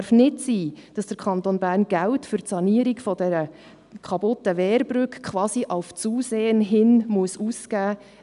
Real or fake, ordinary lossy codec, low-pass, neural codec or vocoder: real; none; 10.8 kHz; none